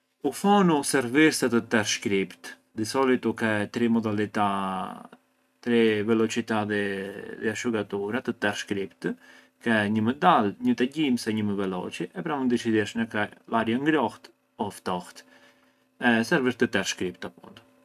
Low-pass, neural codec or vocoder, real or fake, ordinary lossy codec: 14.4 kHz; none; real; none